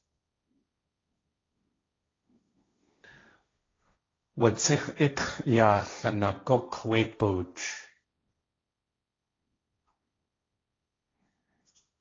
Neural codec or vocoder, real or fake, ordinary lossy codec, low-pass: codec, 16 kHz, 1.1 kbps, Voila-Tokenizer; fake; AAC, 32 kbps; 7.2 kHz